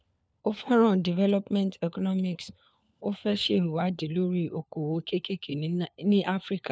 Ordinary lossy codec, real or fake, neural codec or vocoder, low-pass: none; fake; codec, 16 kHz, 4 kbps, FunCodec, trained on LibriTTS, 50 frames a second; none